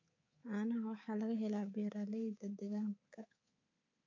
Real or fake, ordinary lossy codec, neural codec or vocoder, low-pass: fake; AAC, 48 kbps; codec, 24 kHz, 3.1 kbps, DualCodec; 7.2 kHz